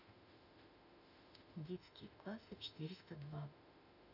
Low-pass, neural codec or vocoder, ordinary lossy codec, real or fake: 5.4 kHz; autoencoder, 48 kHz, 32 numbers a frame, DAC-VAE, trained on Japanese speech; none; fake